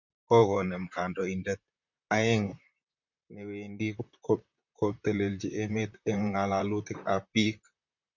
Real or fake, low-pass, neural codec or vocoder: fake; 7.2 kHz; vocoder, 44.1 kHz, 128 mel bands, Pupu-Vocoder